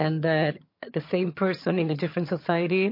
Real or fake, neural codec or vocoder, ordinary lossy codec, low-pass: fake; vocoder, 22.05 kHz, 80 mel bands, HiFi-GAN; MP3, 32 kbps; 5.4 kHz